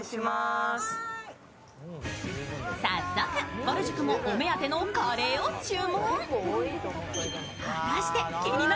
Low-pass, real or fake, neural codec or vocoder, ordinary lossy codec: none; real; none; none